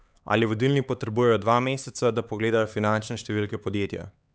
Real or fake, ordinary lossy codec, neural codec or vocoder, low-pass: fake; none; codec, 16 kHz, 4 kbps, X-Codec, HuBERT features, trained on LibriSpeech; none